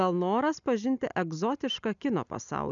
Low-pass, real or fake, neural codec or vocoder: 7.2 kHz; real; none